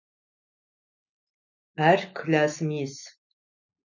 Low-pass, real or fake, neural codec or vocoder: 7.2 kHz; real; none